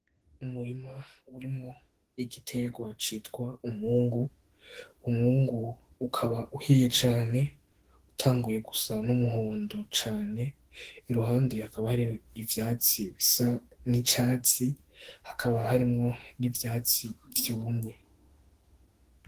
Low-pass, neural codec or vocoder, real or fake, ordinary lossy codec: 14.4 kHz; autoencoder, 48 kHz, 32 numbers a frame, DAC-VAE, trained on Japanese speech; fake; Opus, 16 kbps